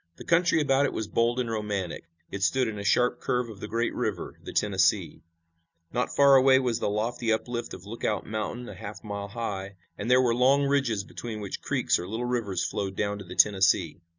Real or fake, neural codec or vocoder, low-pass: real; none; 7.2 kHz